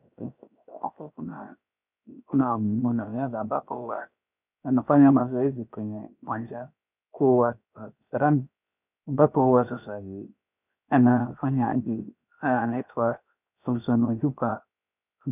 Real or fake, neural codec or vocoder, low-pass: fake; codec, 16 kHz, 0.7 kbps, FocalCodec; 3.6 kHz